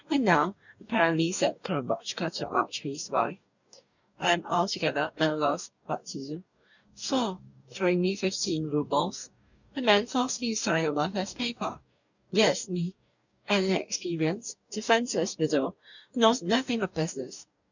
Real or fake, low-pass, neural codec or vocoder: fake; 7.2 kHz; codec, 44.1 kHz, 2.6 kbps, DAC